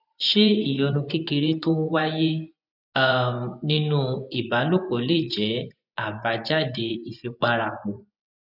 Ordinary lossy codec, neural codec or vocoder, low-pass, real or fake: none; vocoder, 24 kHz, 100 mel bands, Vocos; 5.4 kHz; fake